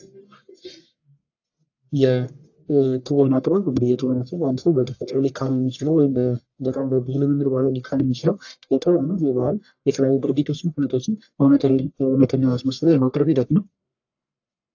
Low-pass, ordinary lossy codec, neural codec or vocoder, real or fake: 7.2 kHz; AAC, 48 kbps; codec, 44.1 kHz, 1.7 kbps, Pupu-Codec; fake